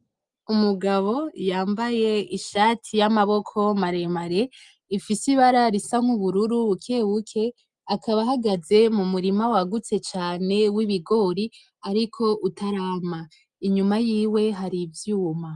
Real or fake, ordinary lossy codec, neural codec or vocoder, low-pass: real; Opus, 32 kbps; none; 10.8 kHz